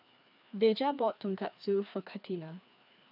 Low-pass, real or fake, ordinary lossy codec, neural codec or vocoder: 5.4 kHz; fake; none; codec, 16 kHz, 2 kbps, FreqCodec, larger model